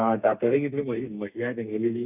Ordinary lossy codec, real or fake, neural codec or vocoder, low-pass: none; fake; codec, 32 kHz, 1.9 kbps, SNAC; 3.6 kHz